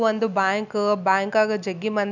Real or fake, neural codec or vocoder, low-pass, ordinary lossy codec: real; none; 7.2 kHz; none